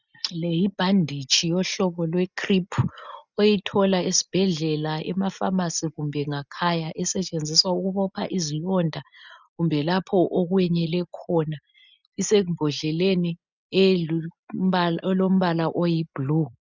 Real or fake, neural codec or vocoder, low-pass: real; none; 7.2 kHz